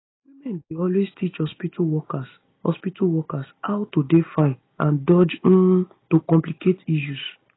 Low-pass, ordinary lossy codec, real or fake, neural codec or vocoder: 7.2 kHz; AAC, 16 kbps; real; none